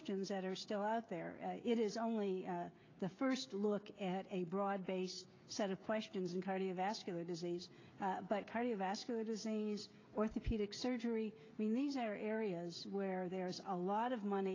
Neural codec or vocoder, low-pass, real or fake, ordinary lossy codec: codec, 16 kHz, 16 kbps, FreqCodec, smaller model; 7.2 kHz; fake; AAC, 32 kbps